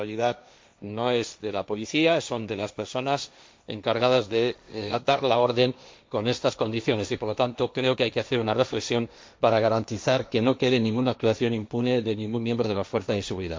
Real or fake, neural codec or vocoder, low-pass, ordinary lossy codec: fake; codec, 16 kHz, 1.1 kbps, Voila-Tokenizer; none; none